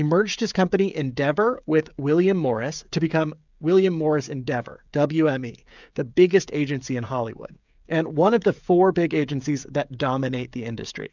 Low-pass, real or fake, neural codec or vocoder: 7.2 kHz; fake; codec, 16 kHz, 16 kbps, FreqCodec, smaller model